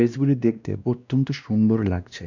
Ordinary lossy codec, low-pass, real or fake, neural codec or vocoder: none; 7.2 kHz; fake; codec, 16 kHz, 1 kbps, X-Codec, WavLM features, trained on Multilingual LibriSpeech